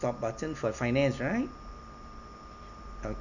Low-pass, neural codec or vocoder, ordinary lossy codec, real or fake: 7.2 kHz; none; none; real